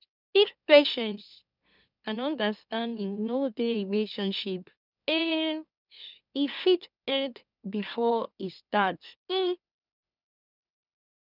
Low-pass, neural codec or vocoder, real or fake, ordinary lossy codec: 5.4 kHz; autoencoder, 44.1 kHz, a latent of 192 numbers a frame, MeloTTS; fake; none